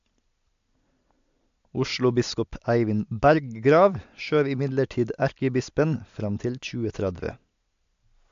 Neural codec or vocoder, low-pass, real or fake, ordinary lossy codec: none; 7.2 kHz; real; AAC, 64 kbps